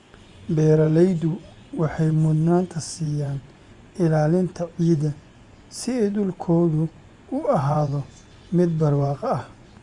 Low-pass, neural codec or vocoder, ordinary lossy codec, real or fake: 10.8 kHz; vocoder, 24 kHz, 100 mel bands, Vocos; Opus, 64 kbps; fake